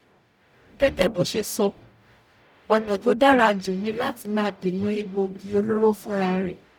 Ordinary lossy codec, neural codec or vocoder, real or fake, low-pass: none; codec, 44.1 kHz, 0.9 kbps, DAC; fake; 19.8 kHz